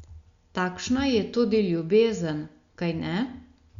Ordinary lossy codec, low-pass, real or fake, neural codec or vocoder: Opus, 64 kbps; 7.2 kHz; real; none